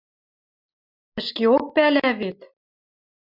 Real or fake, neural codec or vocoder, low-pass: real; none; 5.4 kHz